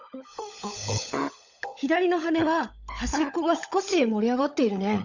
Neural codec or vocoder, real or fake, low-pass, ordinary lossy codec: codec, 16 kHz, 16 kbps, FunCodec, trained on LibriTTS, 50 frames a second; fake; 7.2 kHz; none